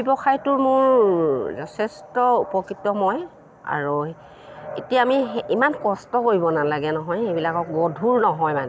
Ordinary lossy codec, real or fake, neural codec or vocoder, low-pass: none; real; none; none